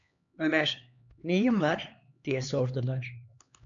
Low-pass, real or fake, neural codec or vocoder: 7.2 kHz; fake; codec, 16 kHz, 2 kbps, X-Codec, HuBERT features, trained on LibriSpeech